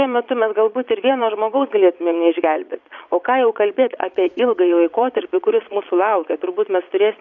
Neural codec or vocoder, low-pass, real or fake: vocoder, 22.05 kHz, 80 mel bands, Vocos; 7.2 kHz; fake